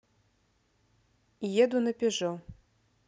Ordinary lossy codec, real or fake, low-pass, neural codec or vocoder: none; real; none; none